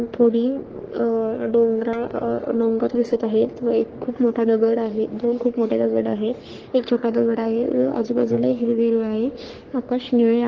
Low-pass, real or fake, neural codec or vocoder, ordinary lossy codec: 7.2 kHz; fake; codec, 44.1 kHz, 3.4 kbps, Pupu-Codec; Opus, 16 kbps